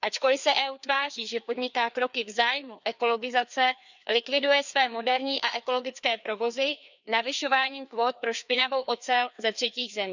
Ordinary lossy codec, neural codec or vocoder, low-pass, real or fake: none; codec, 16 kHz, 2 kbps, FreqCodec, larger model; 7.2 kHz; fake